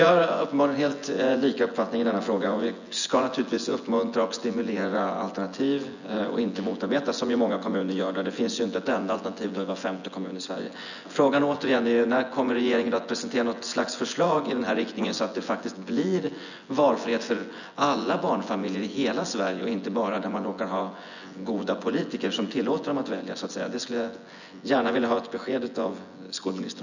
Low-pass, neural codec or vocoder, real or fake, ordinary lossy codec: 7.2 kHz; vocoder, 24 kHz, 100 mel bands, Vocos; fake; none